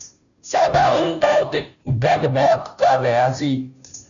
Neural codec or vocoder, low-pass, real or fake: codec, 16 kHz, 0.5 kbps, FunCodec, trained on Chinese and English, 25 frames a second; 7.2 kHz; fake